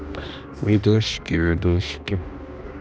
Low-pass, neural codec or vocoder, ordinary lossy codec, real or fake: none; codec, 16 kHz, 1 kbps, X-Codec, HuBERT features, trained on balanced general audio; none; fake